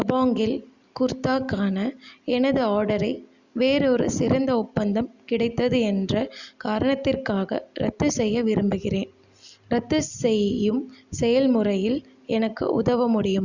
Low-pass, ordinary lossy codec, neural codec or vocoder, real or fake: 7.2 kHz; Opus, 64 kbps; none; real